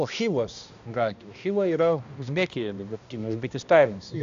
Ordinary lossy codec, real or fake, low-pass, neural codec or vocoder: AAC, 64 kbps; fake; 7.2 kHz; codec, 16 kHz, 1 kbps, X-Codec, HuBERT features, trained on balanced general audio